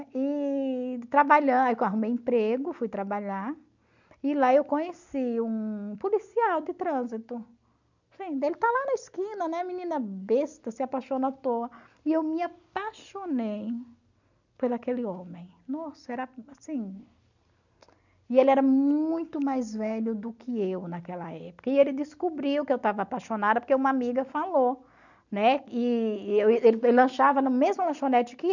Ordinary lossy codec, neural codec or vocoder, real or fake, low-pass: none; none; real; 7.2 kHz